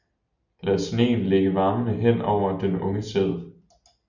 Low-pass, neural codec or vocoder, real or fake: 7.2 kHz; none; real